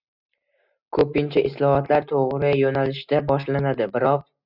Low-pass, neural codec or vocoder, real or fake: 5.4 kHz; none; real